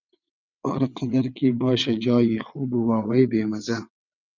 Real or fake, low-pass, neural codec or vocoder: fake; 7.2 kHz; vocoder, 22.05 kHz, 80 mel bands, WaveNeXt